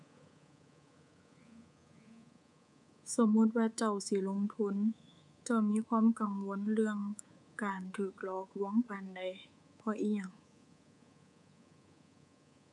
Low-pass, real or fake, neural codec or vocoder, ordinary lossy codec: 10.8 kHz; fake; codec, 24 kHz, 3.1 kbps, DualCodec; none